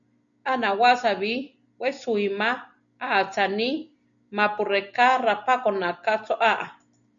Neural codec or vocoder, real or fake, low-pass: none; real; 7.2 kHz